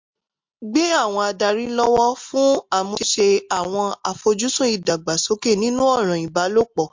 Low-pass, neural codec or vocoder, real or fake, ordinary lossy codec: 7.2 kHz; none; real; MP3, 48 kbps